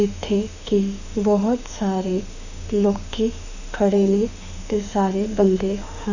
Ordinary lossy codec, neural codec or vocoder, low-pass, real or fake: none; autoencoder, 48 kHz, 32 numbers a frame, DAC-VAE, trained on Japanese speech; 7.2 kHz; fake